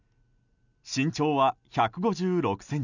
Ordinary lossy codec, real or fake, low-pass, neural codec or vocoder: none; real; 7.2 kHz; none